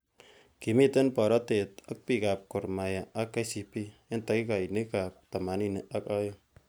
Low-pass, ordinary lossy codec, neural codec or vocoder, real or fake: none; none; none; real